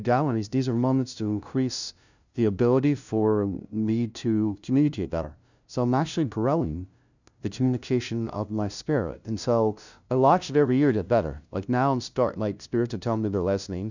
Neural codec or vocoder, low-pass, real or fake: codec, 16 kHz, 0.5 kbps, FunCodec, trained on LibriTTS, 25 frames a second; 7.2 kHz; fake